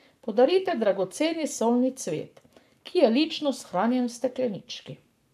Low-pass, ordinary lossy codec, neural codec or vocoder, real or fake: 14.4 kHz; none; codec, 44.1 kHz, 7.8 kbps, Pupu-Codec; fake